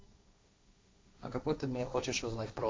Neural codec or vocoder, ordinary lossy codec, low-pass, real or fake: codec, 16 kHz, 1.1 kbps, Voila-Tokenizer; none; none; fake